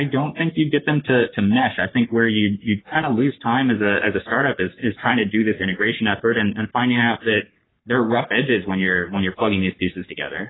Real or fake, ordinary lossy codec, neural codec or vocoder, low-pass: fake; AAC, 16 kbps; codec, 44.1 kHz, 3.4 kbps, Pupu-Codec; 7.2 kHz